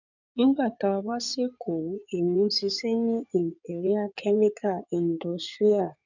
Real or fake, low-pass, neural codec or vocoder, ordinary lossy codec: fake; 7.2 kHz; codec, 16 kHz in and 24 kHz out, 2.2 kbps, FireRedTTS-2 codec; none